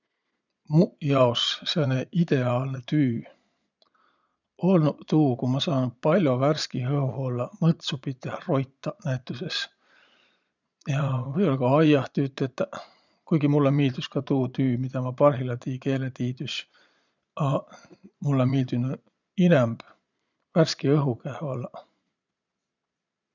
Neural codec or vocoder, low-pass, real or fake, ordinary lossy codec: vocoder, 22.05 kHz, 80 mel bands, Vocos; 7.2 kHz; fake; none